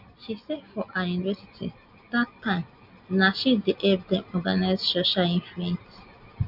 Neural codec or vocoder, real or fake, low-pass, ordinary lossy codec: none; real; 5.4 kHz; none